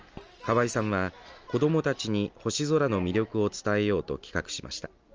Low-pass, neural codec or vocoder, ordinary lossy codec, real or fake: 7.2 kHz; none; Opus, 24 kbps; real